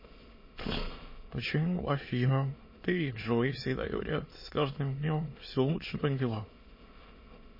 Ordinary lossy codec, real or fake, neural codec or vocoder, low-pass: MP3, 24 kbps; fake; autoencoder, 22.05 kHz, a latent of 192 numbers a frame, VITS, trained on many speakers; 5.4 kHz